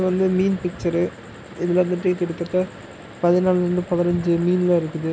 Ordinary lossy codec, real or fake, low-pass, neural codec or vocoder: none; real; none; none